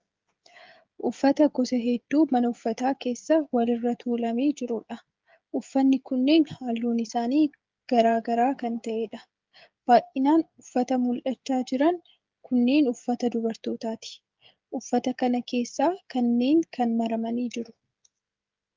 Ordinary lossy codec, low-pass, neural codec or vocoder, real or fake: Opus, 32 kbps; 7.2 kHz; codec, 16 kHz, 16 kbps, FreqCodec, smaller model; fake